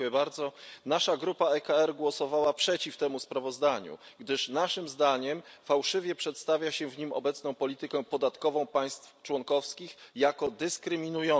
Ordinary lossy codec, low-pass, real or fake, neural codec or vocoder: none; none; real; none